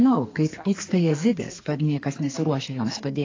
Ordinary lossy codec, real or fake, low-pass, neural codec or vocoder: AAC, 32 kbps; fake; 7.2 kHz; codec, 32 kHz, 1.9 kbps, SNAC